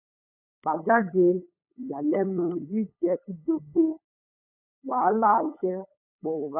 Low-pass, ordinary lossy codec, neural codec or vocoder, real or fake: 3.6 kHz; none; codec, 16 kHz, 8 kbps, FunCodec, trained on LibriTTS, 25 frames a second; fake